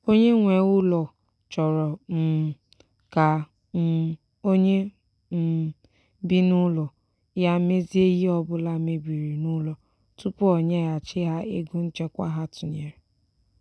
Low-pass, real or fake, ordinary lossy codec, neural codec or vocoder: none; real; none; none